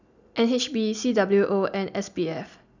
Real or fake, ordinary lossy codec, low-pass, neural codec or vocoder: real; none; 7.2 kHz; none